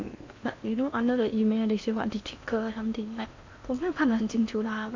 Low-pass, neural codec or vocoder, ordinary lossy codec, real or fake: 7.2 kHz; codec, 16 kHz in and 24 kHz out, 0.8 kbps, FocalCodec, streaming, 65536 codes; MP3, 64 kbps; fake